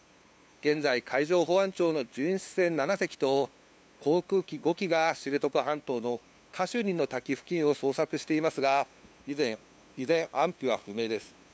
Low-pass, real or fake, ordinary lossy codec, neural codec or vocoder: none; fake; none; codec, 16 kHz, 2 kbps, FunCodec, trained on LibriTTS, 25 frames a second